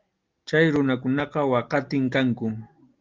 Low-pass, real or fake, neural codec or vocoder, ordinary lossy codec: 7.2 kHz; real; none; Opus, 32 kbps